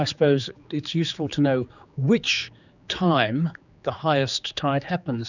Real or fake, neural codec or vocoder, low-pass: fake; codec, 16 kHz, 4 kbps, X-Codec, HuBERT features, trained on general audio; 7.2 kHz